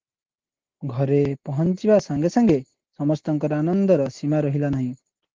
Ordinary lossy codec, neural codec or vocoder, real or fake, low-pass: Opus, 16 kbps; none; real; 7.2 kHz